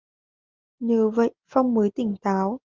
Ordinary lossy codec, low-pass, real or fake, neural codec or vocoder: Opus, 32 kbps; 7.2 kHz; real; none